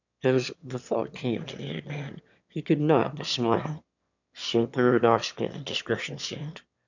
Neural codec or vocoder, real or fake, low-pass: autoencoder, 22.05 kHz, a latent of 192 numbers a frame, VITS, trained on one speaker; fake; 7.2 kHz